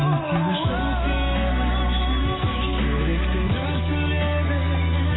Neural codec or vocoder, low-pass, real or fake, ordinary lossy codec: none; 7.2 kHz; real; AAC, 16 kbps